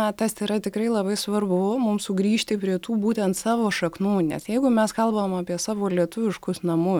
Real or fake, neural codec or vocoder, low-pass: real; none; 19.8 kHz